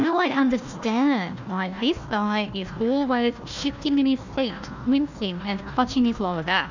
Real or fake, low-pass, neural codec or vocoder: fake; 7.2 kHz; codec, 16 kHz, 1 kbps, FunCodec, trained on Chinese and English, 50 frames a second